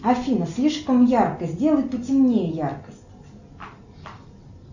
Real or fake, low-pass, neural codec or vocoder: real; 7.2 kHz; none